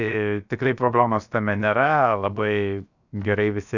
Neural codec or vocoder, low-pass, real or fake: codec, 16 kHz, 0.7 kbps, FocalCodec; 7.2 kHz; fake